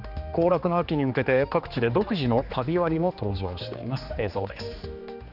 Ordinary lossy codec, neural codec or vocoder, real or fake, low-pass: none; codec, 16 kHz, 2 kbps, X-Codec, HuBERT features, trained on general audio; fake; 5.4 kHz